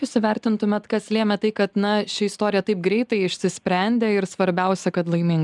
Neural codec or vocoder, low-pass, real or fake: none; 10.8 kHz; real